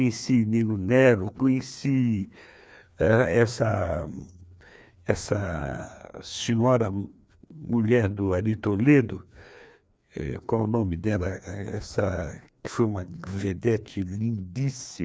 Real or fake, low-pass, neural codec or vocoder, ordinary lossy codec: fake; none; codec, 16 kHz, 2 kbps, FreqCodec, larger model; none